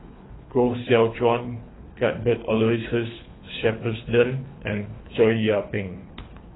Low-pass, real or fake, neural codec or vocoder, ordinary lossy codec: 7.2 kHz; fake; codec, 24 kHz, 3 kbps, HILCodec; AAC, 16 kbps